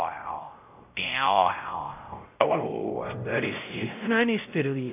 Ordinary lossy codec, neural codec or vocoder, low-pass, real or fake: AAC, 32 kbps; codec, 16 kHz, 0.5 kbps, X-Codec, WavLM features, trained on Multilingual LibriSpeech; 3.6 kHz; fake